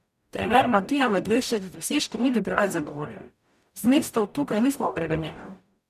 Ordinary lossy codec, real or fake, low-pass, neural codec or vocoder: none; fake; 14.4 kHz; codec, 44.1 kHz, 0.9 kbps, DAC